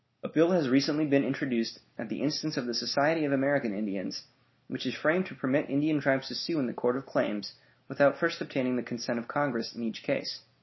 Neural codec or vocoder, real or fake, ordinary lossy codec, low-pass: none; real; MP3, 24 kbps; 7.2 kHz